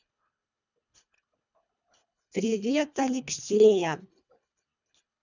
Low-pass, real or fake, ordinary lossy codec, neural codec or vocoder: 7.2 kHz; fake; none; codec, 24 kHz, 1.5 kbps, HILCodec